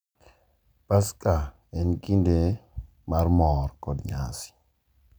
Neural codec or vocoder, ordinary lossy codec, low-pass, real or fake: none; none; none; real